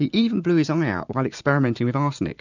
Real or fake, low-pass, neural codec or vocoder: fake; 7.2 kHz; vocoder, 44.1 kHz, 128 mel bands every 512 samples, BigVGAN v2